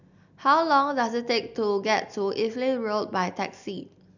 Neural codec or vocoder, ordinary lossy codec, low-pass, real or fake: none; none; 7.2 kHz; real